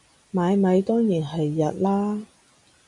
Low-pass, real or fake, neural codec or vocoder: 10.8 kHz; real; none